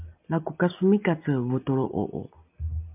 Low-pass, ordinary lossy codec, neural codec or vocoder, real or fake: 3.6 kHz; MP3, 32 kbps; none; real